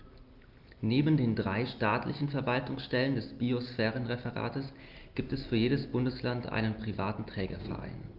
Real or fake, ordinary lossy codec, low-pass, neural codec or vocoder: real; Opus, 32 kbps; 5.4 kHz; none